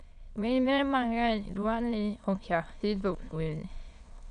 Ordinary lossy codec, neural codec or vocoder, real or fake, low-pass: none; autoencoder, 22.05 kHz, a latent of 192 numbers a frame, VITS, trained on many speakers; fake; 9.9 kHz